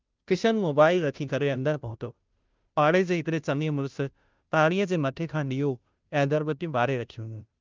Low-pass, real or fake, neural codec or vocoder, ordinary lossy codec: 7.2 kHz; fake; codec, 16 kHz, 0.5 kbps, FunCodec, trained on Chinese and English, 25 frames a second; Opus, 24 kbps